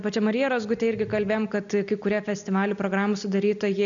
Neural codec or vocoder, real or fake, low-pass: none; real; 7.2 kHz